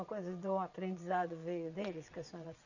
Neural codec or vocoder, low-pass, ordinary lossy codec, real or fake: vocoder, 22.05 kHz, 80 mel bands, WaveNeXt; 7.2 kHz; none; fake